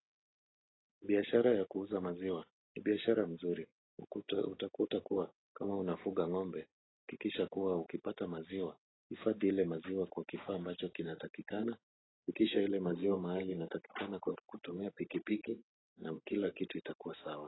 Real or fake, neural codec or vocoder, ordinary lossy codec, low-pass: real; none; AAC, 16 kbps; 7.2 kHz